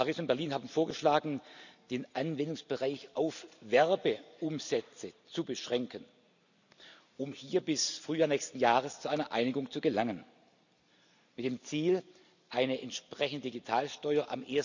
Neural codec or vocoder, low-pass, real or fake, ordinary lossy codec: none; 7.2 kHz; real; none